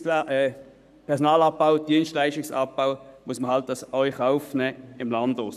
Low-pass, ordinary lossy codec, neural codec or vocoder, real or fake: 14.4 kHz; none; codec, 44.1 kHz, 7.8 kbps, Pupu-Codec; fake